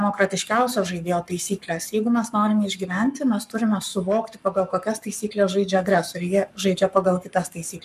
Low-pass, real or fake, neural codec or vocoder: 14.4 kHz; fake; codec, 44.1 kHz, 7.8 kbps, Pupu-Codec